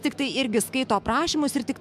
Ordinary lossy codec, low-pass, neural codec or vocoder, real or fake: MP3, 96 kbps; 14.4 kHz; autoencoder, 48 kHz, 128 numbers a frame, DAC-VAE, trained on Japanese speech; fake